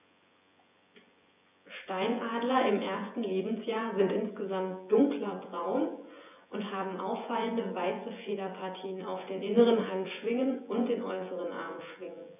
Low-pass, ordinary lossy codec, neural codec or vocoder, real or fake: 3.6 kHz; none; vocoder, 24 kHz, 100 mel bands, Vocos; fake